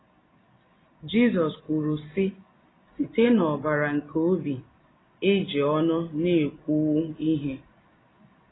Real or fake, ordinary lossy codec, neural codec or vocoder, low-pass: real; AAC, 16 kbps; none; 7.2 kHz